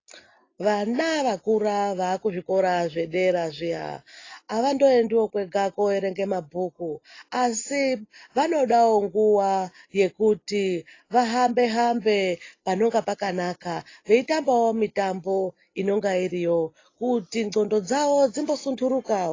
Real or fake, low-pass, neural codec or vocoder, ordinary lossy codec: real; 7.2 kHz; none; AAC, 32 kbps